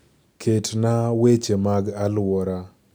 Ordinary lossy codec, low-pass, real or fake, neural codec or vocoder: none; none; real; none